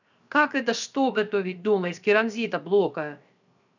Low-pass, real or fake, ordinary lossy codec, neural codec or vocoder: 7.2 kHz; fake; none; codec, 16 kHz, 0.7 kbps, FocalCodec